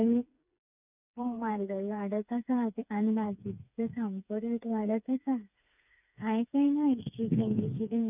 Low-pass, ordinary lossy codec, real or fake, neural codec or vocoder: 3.6 kHz; none; fake; codec, 16 kHz, 4 kbps, FreqCodec, smaller model